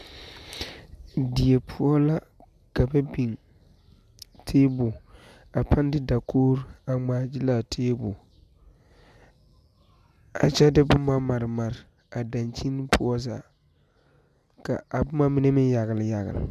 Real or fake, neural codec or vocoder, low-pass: real; none; 14.4 kHz